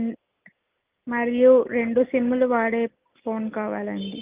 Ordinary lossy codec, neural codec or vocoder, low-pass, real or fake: Opus, 32 kbps; none; 3.6 kHz; real